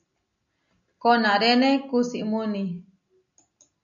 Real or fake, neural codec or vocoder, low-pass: real; none; 7.2 kHz